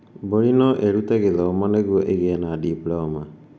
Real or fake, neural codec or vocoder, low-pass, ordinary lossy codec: real; none; none; none